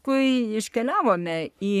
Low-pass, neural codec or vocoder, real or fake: 14.4 kHz; codec, 44.1 kHz, 3.4 kbps, Pupu-Codec; fake